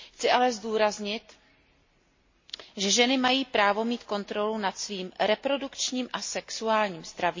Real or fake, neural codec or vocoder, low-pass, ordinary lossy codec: real; none; 7.2 kHz; MP3, 32 kbps